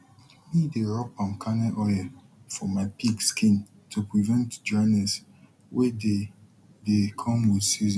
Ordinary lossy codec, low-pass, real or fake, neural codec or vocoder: none; none; real; none